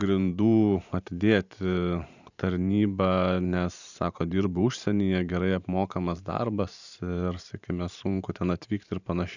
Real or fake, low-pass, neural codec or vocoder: fake; 7.2 kHz; vocoder, 44.1 kHz, 128 mel bands every 512 samples, BigVGAN v2